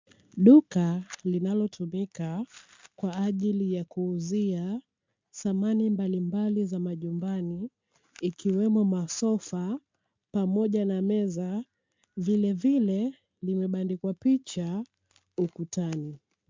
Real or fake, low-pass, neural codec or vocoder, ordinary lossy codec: real; 7.2 kHz; none; MP3, 64 kbps